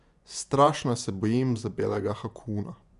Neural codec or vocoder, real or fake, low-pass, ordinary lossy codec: vocoder, 24 kHz, 100 mel bands, Vocos; fake; 10.8 kHz; MP3, 96 kbps